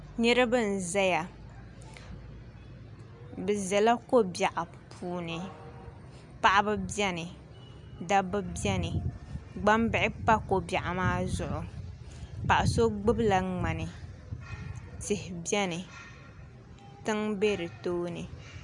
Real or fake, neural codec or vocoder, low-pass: real; none; 10.8 kHz